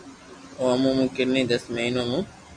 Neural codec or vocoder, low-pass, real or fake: none; 9.9 kHz; real